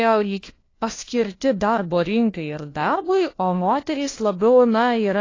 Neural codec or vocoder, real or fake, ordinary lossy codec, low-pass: codec, 16 kHz, 1 kbps, FunCodec, trained on LibriTTS, 50 frames a second; fake; AAC, 32 kbps; 7.2 kHz